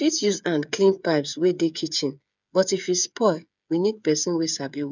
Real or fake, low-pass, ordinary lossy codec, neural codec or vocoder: fake; 7.2 kHz; none; codec, 16 kHz, 8 kbps, FreqCodec, smaller model